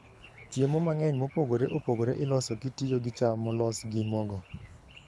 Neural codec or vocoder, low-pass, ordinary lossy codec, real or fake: codec, 24 kHz, 6 kbps, HILCodec; none; none; fake